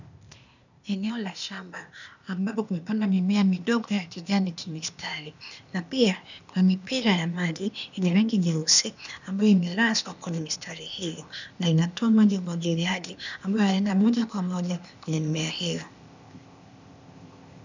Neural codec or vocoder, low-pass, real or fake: codec, 16 kHz, 0.8 kbps, ZipCodec; 7.2 kHz; fake